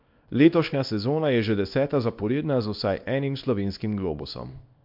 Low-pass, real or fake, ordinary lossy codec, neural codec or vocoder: 5.4 kHz; fake; MP3, 48 kbps; codec, 24 kHz, 0.9 kbps, WavTokenizer, small release